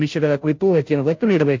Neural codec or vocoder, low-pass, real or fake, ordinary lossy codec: codec, 16 kHz, 0.5 kbps, FunCodec, trained on Chinese and English, 25 frames a second; 7.2 kHz; fake; MP3, 48 kbps